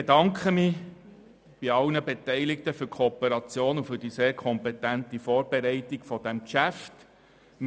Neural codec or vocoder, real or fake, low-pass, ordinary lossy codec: none; real; none; none